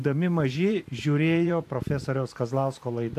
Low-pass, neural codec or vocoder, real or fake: 14.4 kHz; vocoder, 44.1 kHz, 128 mel bands every 512 samples, BigVGAN v2; fake